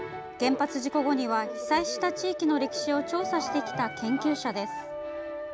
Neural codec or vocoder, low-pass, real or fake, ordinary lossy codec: none; none; real; none